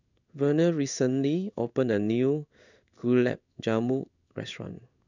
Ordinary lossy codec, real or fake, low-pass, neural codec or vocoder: none; fake; 7.2 kHz; codec, 16 kHz in and 24 kHz out, 1 kbps, XY-Tokenizer